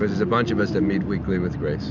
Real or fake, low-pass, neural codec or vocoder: real; 7.2 kHz; none